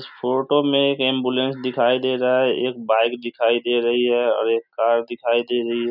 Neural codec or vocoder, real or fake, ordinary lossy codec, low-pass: none; real; none; 5.4 kHz